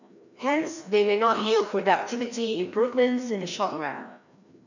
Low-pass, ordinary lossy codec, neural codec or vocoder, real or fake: 7.2 kHz; none; codec, 16 kHz, 1 kbps, FreqCodec, larger model; fake